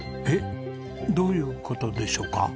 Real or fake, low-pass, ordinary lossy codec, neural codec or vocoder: real; none; none; none